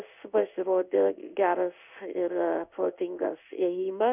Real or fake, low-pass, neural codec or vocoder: fake; 3.6 kHz; codec, 16 kHz in and 24 kHz out, 1 kbps, XY-Tokenizer